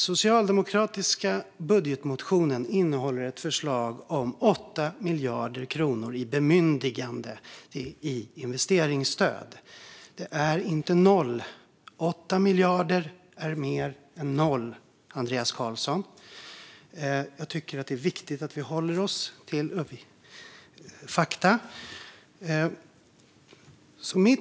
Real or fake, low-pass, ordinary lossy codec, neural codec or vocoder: real; none; none; none